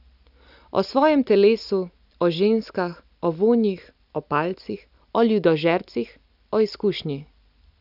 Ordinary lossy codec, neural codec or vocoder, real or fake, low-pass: none; none; real; 5.4 kHz